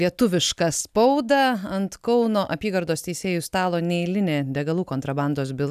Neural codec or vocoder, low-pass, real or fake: none; 14.4 kHz; real